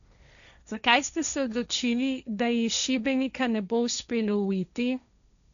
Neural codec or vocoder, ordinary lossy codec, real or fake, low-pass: codec, 16 kHz, 1.1 kbps, Voila-Tokenizer; none; fake; 7.2 kHz